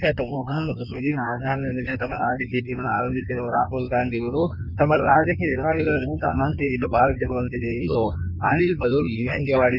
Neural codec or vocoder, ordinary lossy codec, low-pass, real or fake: codec, 16 kHz in and 24 kHz out, 1.1 kbps, FireRedTTS-2 codec; none; 5.4 kHz; fake